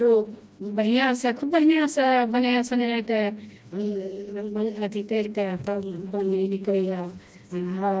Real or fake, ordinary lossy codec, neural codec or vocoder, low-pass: fake; none; codec, 16 kHz, 1 kbps, FreqCodec, smaller model; none